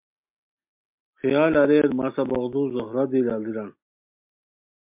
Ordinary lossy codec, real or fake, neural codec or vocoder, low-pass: MP3, 32 kbps; real; none; 3.6 kHz